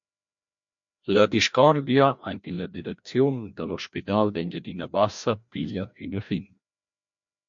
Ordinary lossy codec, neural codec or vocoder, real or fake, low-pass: MP3, 48 kbps; codec, 16 kHz, 1 kbps, FreqCodec, larger model; fake; 7.2 kHz